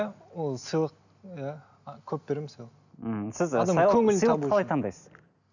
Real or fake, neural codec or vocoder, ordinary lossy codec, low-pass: real; none; none; 7.2 kHz